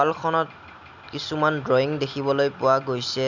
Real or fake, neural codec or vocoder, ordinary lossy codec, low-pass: real; none; none; 7.2 kHz